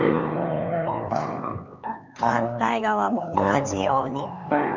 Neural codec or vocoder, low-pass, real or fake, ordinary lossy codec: codec, 16 kHz, 4 kbps, X-Codec, HuBERT features, trained on LibriSpeech; 7.2 kHz; fake; none